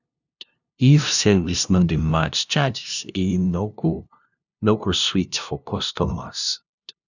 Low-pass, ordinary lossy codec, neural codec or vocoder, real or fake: 7.2 kHz; none; codec, 16 kHz, 0.5 kbps, FunCodec, trained on LibriTTS, 25 frames a second; fake